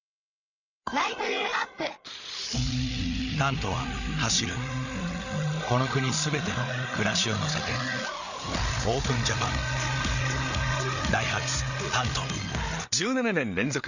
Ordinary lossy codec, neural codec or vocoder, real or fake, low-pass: none; codec, 16 kHz, 8 kbps, FreqCodec, larger model; fake; 7.2 kHz